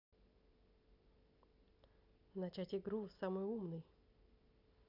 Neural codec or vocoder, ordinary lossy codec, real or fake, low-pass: none; none; real; 5.4 kHz